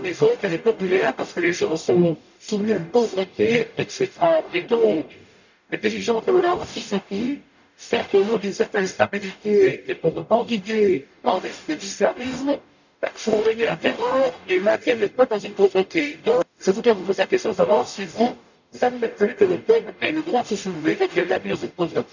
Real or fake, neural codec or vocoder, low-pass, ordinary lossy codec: fake; codec, 44.1 kHz, 0.9 kbps, DAC; 7.2 kHz; none